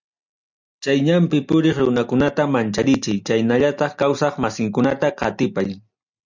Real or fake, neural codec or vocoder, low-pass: real; none; 7.2 kHz